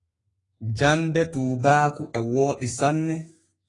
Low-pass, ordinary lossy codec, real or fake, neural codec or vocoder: 10.8 kHz; AAC, 32 kbps; fake; codec, 32 kHz, 1.9 kbps, SNAC